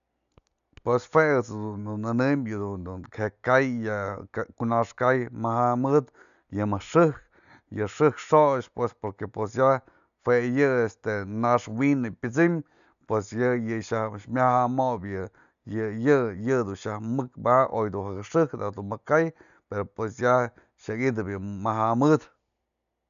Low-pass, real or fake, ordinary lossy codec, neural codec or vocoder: 7.2 kHz; real; none; none